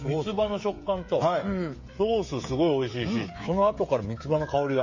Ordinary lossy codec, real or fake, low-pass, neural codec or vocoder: MP3, 32 kbps; fake; 7.2 kHz; codec, 16 kHz, 16 kbps, FreqCodec, smaller model